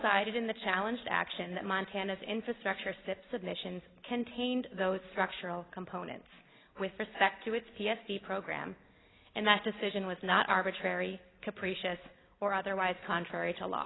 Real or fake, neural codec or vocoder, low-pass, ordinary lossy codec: real; none; 7.2 kHz; AAC, 16 kbps